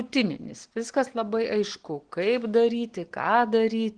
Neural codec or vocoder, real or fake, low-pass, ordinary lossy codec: none; real; 9.9 kHz; Opus, 16 kbps